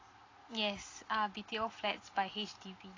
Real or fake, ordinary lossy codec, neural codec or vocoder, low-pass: real; AAC, 32 kbps; none; 7.2 kHz